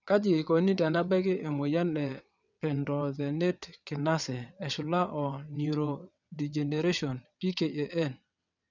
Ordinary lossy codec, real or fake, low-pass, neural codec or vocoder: none; fake; 7.2 kHz; vocoder, 22.05 kHz, 80 mel bands, WaveNeXt